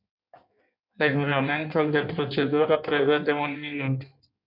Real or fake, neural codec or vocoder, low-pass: fake; codec, 16 kHz in and 24 kHz out, 1.1 kbps, FireRedTTS-2 codec; 5.4 kHz